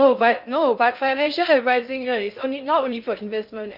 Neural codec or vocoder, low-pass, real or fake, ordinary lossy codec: codec, 16 kHz in and 24 kHz out, 0.6 kbps, FocalCodec, streaming, 4096 codes; 5.4 kHz; fake; none